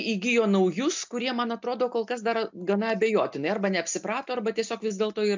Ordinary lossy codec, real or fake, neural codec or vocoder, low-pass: MP3, 64 kbps; real; none; 7.2 kHz